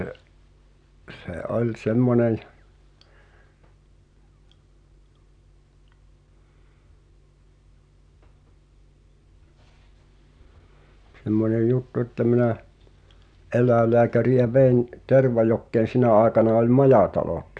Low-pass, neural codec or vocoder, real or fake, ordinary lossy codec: 9.9 kHz; none; real; none